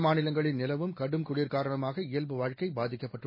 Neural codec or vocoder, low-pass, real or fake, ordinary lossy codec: none; 5.4 kHz; real; MP3, 24 kbps